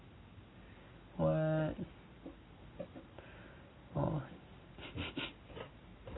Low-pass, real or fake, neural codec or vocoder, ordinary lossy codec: 7.2 kHz; real; none; AAC, 16 kbps